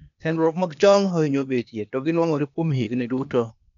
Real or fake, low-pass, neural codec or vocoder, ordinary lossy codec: fake; 7.2 kHz; codec, 16 kHz, 0.8 kbps, ZipCodec; none